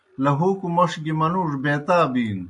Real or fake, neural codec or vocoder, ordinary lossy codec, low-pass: real; none; AAC, 64 kbps; 10.8 kHz